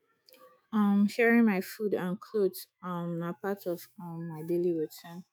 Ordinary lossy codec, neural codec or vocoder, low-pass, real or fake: none; autoencoder, 48 kHz, 128 numbers a frame, DAC-VAE, trained on Japanese speech; none; fake